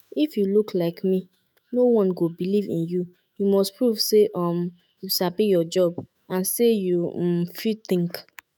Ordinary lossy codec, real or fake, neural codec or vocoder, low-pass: none; fake; autoencoder, 48 kHz, 128 numbers a frame, DAC-VAE, trained on Japanese speech; none